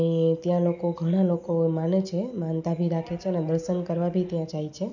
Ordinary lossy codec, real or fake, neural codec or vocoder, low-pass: none; real; none; 7.2 kHz